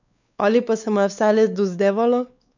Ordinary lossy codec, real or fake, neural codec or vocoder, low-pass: none; fake; codec, 16 kHz, 4 kbps, X-Codec, WavLM features, trained on Multilingual LibriSpeech; 7.2 kHz